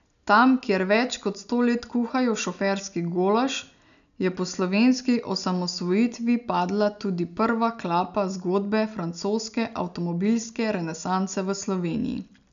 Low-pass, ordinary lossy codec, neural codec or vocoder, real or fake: 7.2 kHz; none; none; real